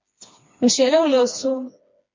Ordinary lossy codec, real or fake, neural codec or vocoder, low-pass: MP3, 48 kbps; fake; codec, 16 kHz, 2 kbps, FreqCodec, smaller model; 7.2 kHz